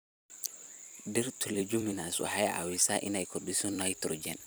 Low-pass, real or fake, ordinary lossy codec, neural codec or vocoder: none; fake; none; vocoder, 44.1 kHz, 128 mel bands every 256 samples, BigVGAN v2